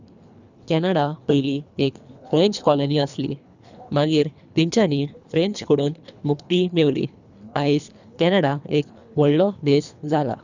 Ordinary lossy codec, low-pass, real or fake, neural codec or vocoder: none; 7.2 kHz; fake; codec, 24 kHz, 3 kbps, HILCodec